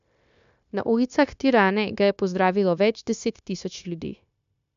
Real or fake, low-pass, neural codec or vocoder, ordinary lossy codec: fake; 7.2 kHz; codec, 16 kHz, 0.9 kbps, LongCat-Audio-Codec; none